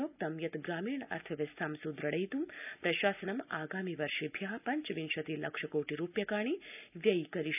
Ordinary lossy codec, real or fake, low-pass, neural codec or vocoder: none; real; 3.6 kHz; none